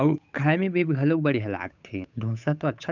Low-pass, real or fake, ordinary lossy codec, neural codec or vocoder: 7.2 kHz; fake; none; codec, 16 kHz, 8 kbps, FunCodec, trained on Chinese and English, 25 frames a second